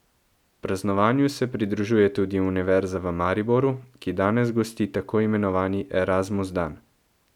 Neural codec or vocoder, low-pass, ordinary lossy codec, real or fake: none; 19.8 kHz; none; real